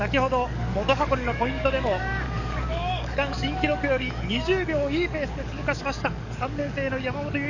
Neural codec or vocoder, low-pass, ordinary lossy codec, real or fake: codec, 44.1 kHz, 7.8 kbps, DAC; 7.2 kHz; none; fake